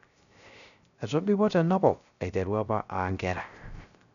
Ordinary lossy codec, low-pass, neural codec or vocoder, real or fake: none; 7.2 kHz; codec, 16 kHz, 0.3 kbps, FocalCodec; fake